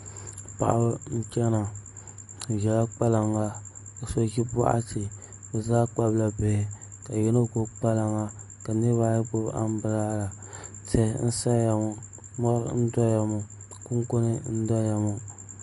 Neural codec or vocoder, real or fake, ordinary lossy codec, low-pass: none; real; MP3, 48 kbps; 14.4 kHz